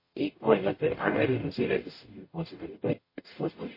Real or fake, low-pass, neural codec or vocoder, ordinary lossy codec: fake; 5.4 kHz; codec, 44.1 kHz, 0.9 kbps, DAC; MP3, 24 kbps